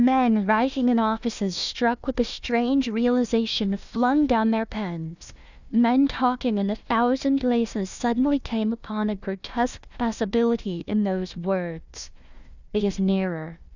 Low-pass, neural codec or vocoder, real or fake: 7.2 kHz; codec, 16 kHz, 1 kbps, FunCodec, trained on Chinese and English, 50 frames a second; fake